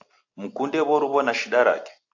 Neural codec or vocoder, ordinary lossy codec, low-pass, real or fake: none; AAC, 48 kbps; 7.2 kHz; real